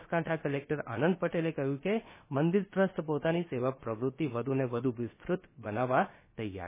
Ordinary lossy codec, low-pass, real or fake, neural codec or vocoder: MP3, 16 kbps; 3.6 kHz; fake; codec, 16 kHz, about 1 kbps, DyCAST, with the encoder's durations